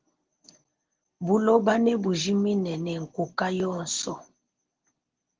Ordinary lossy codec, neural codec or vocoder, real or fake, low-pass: Opus, 16 kbps; none; real; 7.2 kHz